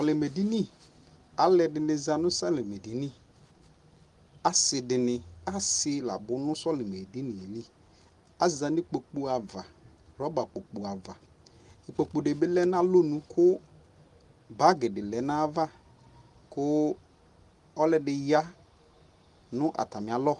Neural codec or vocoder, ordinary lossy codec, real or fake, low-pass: none; Opus, 24 kbps; real; 10.8 kHz